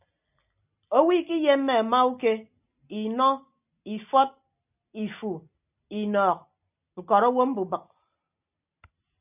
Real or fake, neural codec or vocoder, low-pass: real; none; 3.6 kHz